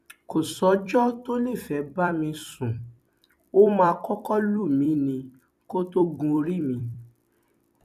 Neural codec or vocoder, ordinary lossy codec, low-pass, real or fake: vocoder, 44.1 kHz, 128 mel bands every 256 samples, BigVGAN v2; none; 14.4 kHz; fake